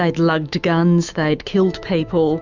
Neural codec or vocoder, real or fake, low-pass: autoencoder, 48 kHz, 128 numbers a frame, DAC-VAE, trained on Japanese speech; fake; 7.2 kHz